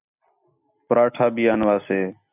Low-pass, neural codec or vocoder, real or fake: 3.6 kHz; none; real